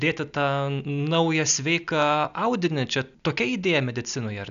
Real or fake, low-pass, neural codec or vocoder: real; 7.2 kHz; none